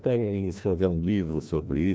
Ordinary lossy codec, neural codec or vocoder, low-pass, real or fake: none; codec, 16 kHz, 1 kbps, FreqCodec, larger model; none; fake